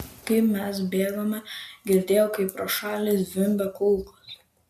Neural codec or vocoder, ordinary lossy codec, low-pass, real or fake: none; MP3, 64 kbps; 14.4 kHz; real